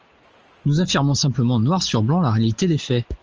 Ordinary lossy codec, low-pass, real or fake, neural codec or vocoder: Opus, 24 kbps; 7.2 kHz; real; none